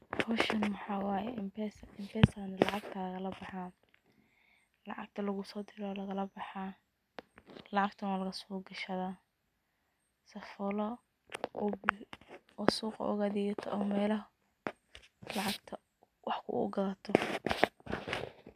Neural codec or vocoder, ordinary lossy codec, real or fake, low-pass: none; MP3, 96 kbps; real; 14.4 kHz